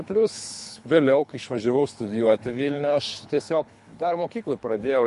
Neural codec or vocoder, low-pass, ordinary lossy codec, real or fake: codec, 24 kHz, 3 kbps, HILCodec; 10.8 kHz; MP3, 64 kbps; fake